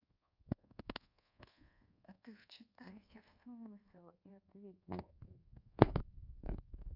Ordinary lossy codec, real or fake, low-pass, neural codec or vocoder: none; fake; 5.4 kHz; codec, 24 kHz, 1.2 kbps, DualCodec